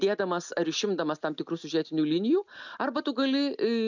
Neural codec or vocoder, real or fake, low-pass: none; real; 7.2 kHz